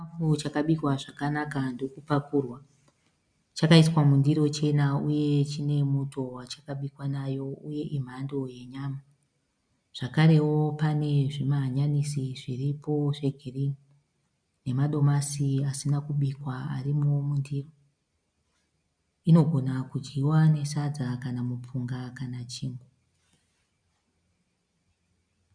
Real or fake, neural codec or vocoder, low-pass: real; none; 9.9 kHz